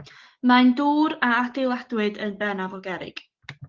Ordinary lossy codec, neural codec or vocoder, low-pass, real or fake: Opus, 16 kbps; none; 7.2 kHz; real